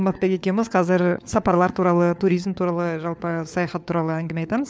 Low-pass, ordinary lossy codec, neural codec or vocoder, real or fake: none; none; codec, 16 kHz, 4 kbps, FunCodec, trained on LibriTTS, 50 frames a second; fake